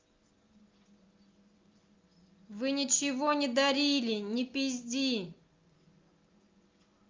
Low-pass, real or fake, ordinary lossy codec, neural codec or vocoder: 7.2 kHz; real; Opus, 24 kbps; none